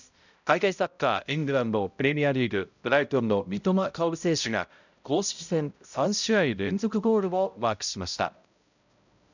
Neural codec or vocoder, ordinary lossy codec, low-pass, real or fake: codec, 16 kHz, 0.5 kbps, X-Codec, HuBERT features, trained on balanced general audio; none; 7.2 kHz; fake